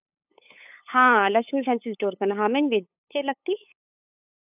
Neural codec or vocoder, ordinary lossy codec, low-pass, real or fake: codec, 16 kHz, 8 kbps, FunCodec, trained on LibriTTS, 25 frames a second; none; 3.6 kHz; fake